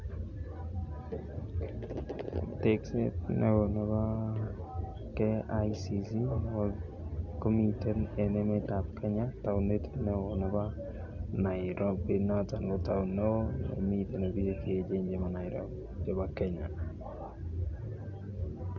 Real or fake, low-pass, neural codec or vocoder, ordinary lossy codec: real; 7.2 kHz; none; none